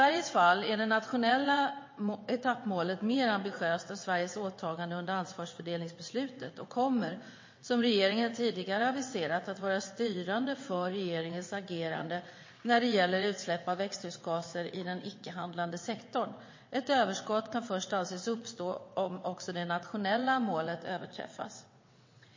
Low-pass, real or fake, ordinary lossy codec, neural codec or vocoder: 7.2 kHz; fake; MP3, 32 kbps; vocoder, 22.05 kHz, 80 mel bands, WaveNeXt